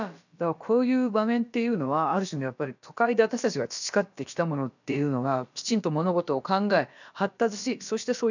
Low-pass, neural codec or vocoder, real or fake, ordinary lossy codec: 7.2 kHz; codec, 16 kHz, about 1 kbps, DyCAST, with the encoder's durations; fake; none